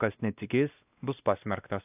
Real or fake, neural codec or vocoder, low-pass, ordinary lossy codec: fake; codec, 16 kHz, 1 kbps, X-Codec, WavLM features, trained on Multilingual LibriSpeech; 3.6 kHz; AAC, 32 kbps